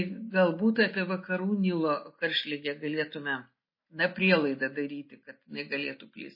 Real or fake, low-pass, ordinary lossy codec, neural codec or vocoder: real; 5.4 kHz; MP3, 24 kbps; none